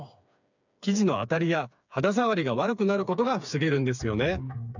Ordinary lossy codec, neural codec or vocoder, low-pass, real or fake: none; codec, 16 kHz, 4 kbps, FreqCodec, smaller model; 7.2 kHz; fake